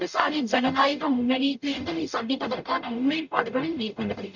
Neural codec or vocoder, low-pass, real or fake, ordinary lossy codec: codec, 44.1 kHz, 0.9 kbps, DAC; 7.2 kHz; fake; none